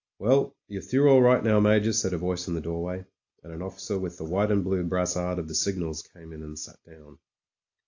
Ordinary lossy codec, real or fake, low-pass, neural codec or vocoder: AAC, 48 kbps; real; 7.2 kHz; none